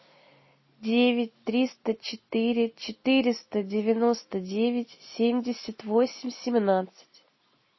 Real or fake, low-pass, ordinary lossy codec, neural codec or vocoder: real; 7.2 kHz; MP3, 24 kbps; none